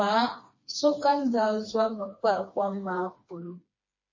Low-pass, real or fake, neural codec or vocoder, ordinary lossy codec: 7.2 kHz; fake; codec, 16 kHz, 2 kbps, FreqCodec, smaller model; MP3, 32 kbps